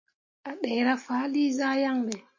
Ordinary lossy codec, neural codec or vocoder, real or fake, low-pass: MP3, 32 kbps; none; real; 7.2 kHz